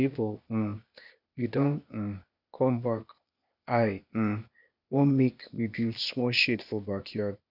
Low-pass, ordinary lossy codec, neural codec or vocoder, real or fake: 5.4 kHz; none; codec, 16 kHz, 0.8 kbps, ZipCodec; fake